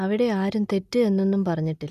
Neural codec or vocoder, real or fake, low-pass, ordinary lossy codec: none; real; 14.4 kHz; none